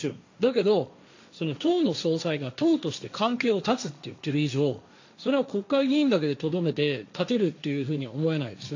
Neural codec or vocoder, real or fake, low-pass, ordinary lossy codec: codec, 16 kHz, 1.1 kbps, Voila-Tokenizer; fake; 7.2 kHz; AAC, 48 kbps